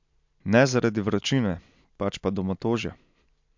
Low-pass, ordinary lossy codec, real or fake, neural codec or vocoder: 7.2 kHz; MP3, 64 kbps; real; none